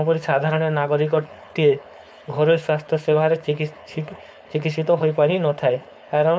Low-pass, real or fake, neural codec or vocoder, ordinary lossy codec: none; fake; codec, 16 kHz, 4.8 kbps, FACodec; none